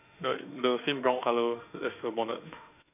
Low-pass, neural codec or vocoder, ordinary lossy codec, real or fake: 3.6 kHz; autoencoder, 48 kHz, 32 numbers a frame, DAC-VAE, trained on Japanese speech; none; fake